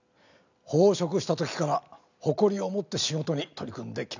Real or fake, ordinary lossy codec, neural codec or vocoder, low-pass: real; none; none; 7.2 kHz